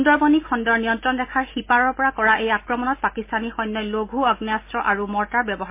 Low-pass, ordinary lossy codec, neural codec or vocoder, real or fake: 3.6 kHz; MP3, 24 kbps; none; real